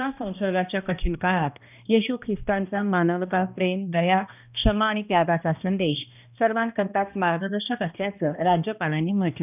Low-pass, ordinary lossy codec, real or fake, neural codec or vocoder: 3.6 kHz; none; fake; codec, 16 kHz, 1 kbps, X-Codec, HuBERT features, trained on balanced general audio